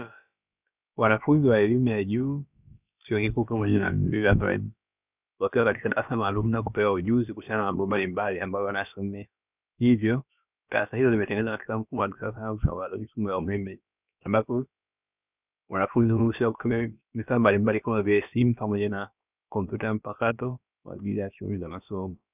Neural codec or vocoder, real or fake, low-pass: codec, 16 kHz, about 1 kbps, DyCAST, with the encoder's durations; fake; 3.6 kHz